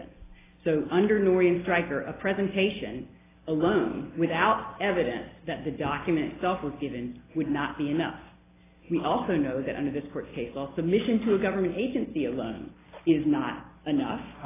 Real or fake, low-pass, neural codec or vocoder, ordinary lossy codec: real; 3.6 kHz; none; AAC, 16 kbps